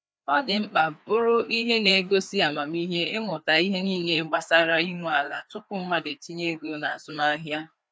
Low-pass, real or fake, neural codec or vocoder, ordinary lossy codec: none; fake; codec, 16 kHz, 2 kbps, FreqCodec, larger model; none